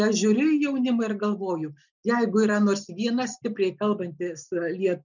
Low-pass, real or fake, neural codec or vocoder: 7.2 kHz; real; none